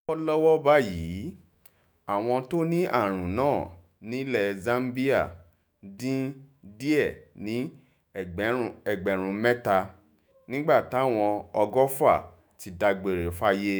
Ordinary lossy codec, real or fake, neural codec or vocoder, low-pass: none; fake; autoencoder, 48 kHz, 128 numbers a frame, DAC-VAE, trained on Japanese speech; none